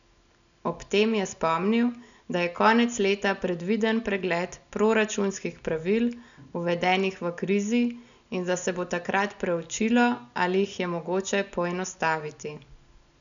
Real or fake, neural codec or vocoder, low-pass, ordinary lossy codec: real; none; 7.2 kHz; none